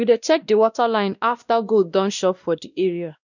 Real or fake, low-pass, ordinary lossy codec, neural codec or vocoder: fake; 7.2 kHz; none; codec, 16 kHz, 1 kbps, X-Codec, WavLM features, trained on Multilingual LibriSpeech